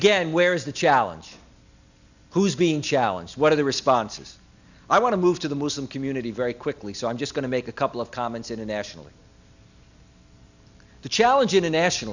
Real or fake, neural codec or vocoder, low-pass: real; none; 7.2 kHz